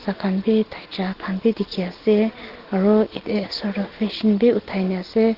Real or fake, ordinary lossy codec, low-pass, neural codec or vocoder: real; Opus, 16 kbps; 5.4 kHz; none